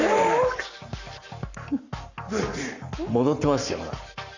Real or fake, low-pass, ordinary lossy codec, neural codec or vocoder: fake; 7.2 kHz; none; codec, 44.1 kHz, 7.8 kbps, Pupu-Codec